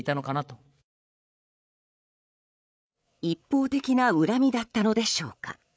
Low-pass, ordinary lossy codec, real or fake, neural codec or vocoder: none; none; fake; codec, 16 kHz, 8 kbps, FreqCodec, larger model